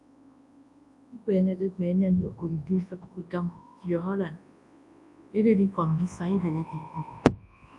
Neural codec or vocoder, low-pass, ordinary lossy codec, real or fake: codec, 24 kHz, 0.9 kbps, WavTokenizer, large speech release; 10.8 kHz; AAC, 64 kbps; fake